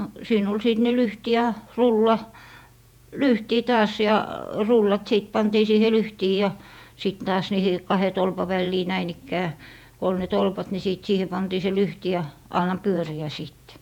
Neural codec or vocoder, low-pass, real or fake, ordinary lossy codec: vocoder, 48 kHz, 128 mel bands, Vocos; 19.8 kHz; fake; none